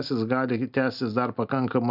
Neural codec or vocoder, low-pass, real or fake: none; 5.4 kHz; real